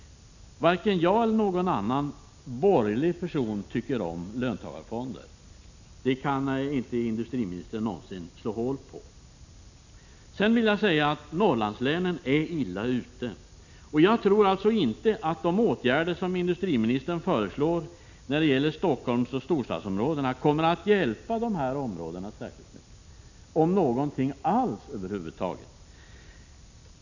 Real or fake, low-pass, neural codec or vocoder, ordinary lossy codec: real; 7.2 kHz; none; none